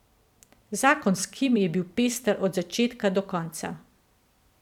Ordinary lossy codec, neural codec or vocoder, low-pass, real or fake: none; vocoder, 44.1 kHz, 128 mel bands every 256 samples, BigVGAN v2; 19.8 kHz; fake